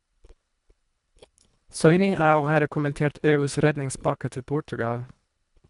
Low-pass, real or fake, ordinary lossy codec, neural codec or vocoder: 10.8 kHz; fake; MP3, 96 kbps; codec, 24 kHz, 1.5 kbps, HILCodec